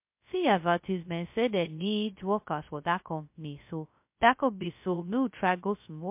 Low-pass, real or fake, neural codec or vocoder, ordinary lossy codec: 3.6 kHz; fake; codec, 16 kHz, 0.2 kbps, FocalCodec; MP3, 32 kbps